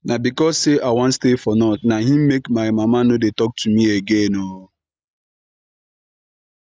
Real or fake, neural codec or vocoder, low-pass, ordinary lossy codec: real; none; none; none